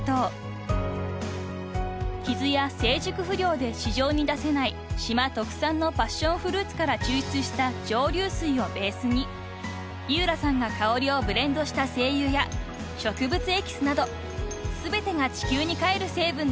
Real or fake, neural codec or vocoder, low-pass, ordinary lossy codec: real; none; none; none